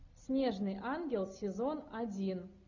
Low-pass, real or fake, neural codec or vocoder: 7.2 kHz; real; none